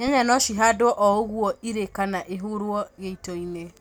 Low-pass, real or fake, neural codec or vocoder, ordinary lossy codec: none; real; none; none